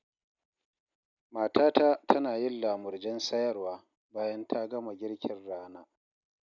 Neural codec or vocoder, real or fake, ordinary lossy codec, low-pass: none; real; none; 7.2 kHz